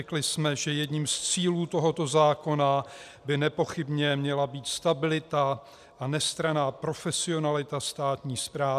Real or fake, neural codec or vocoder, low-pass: real; none; 14.4 kHz